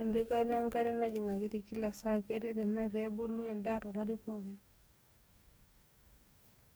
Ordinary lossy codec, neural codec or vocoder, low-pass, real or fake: none; codec, 44.1 kHz, 2.6 kbps, DAC; none; fake